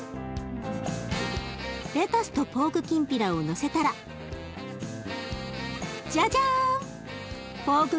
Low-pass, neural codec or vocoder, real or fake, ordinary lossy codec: none; none; real; none